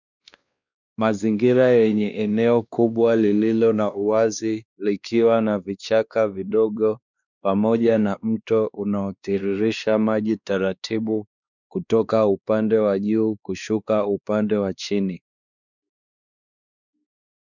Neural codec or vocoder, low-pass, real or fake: codec, 16 kHz, 2 kbps, X-Codec, WavLM features, trained on Multilingual LibriSpeech; 7.2 kHz; fake